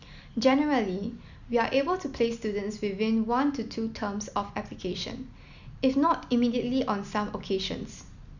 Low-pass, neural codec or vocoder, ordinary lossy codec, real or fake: 7.2 kHz; none; none; real